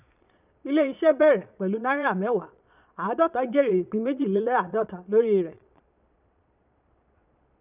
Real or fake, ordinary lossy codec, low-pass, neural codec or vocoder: fake; none; 3.6 kHz; vocoder, 22.05 kHz, 80 mel bands, Vocos